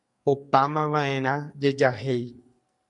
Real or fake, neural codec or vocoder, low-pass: fake; codec, 44.1 kHz, 2.6 kbps, SNAC; 10.8 kHz